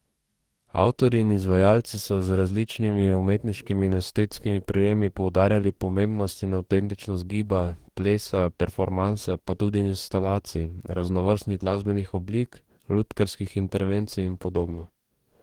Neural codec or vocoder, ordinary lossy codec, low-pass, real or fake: codec, 44.1 kHz, 2.6 kbps, DAC; Opus, 24 kbps; 19.8 kHz; fake